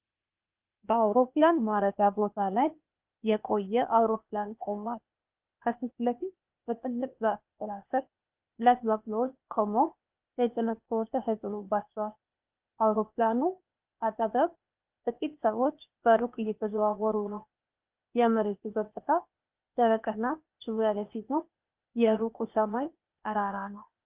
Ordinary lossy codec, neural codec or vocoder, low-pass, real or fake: Opus, 24 kbps; codec, 16 kHz, 0.8 kbps, ZipCodec; 3.6 kHz; fake